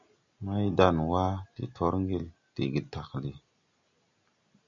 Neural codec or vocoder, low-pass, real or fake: none; 7.2 kHz; real